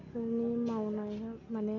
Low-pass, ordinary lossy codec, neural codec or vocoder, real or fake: 7.2 kHz; none; none; real